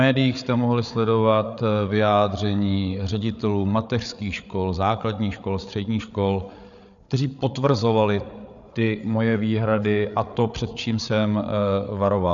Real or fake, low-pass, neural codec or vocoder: fake; 7.2 kHz; codec, 16 kHz, 8 kbps, FreqCodec, larger model